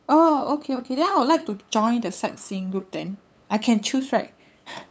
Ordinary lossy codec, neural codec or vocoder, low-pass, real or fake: none; codec, 16 kHz, 8 kbps, FunCodec, trained on LibriTTS, 25 frames a second; none; fake